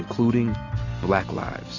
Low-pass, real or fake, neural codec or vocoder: 7.2 kHz; real; none